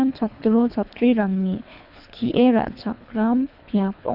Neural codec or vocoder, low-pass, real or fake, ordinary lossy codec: codec, 24 kHz, 3 kbps, HILCodec; 5.4 kHz; fake; none